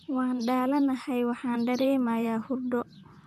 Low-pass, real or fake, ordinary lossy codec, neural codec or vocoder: 14.4 kHz; fake; none; vocoder, 44.1 kHz, 128 mel bands, Pupu-Vocoder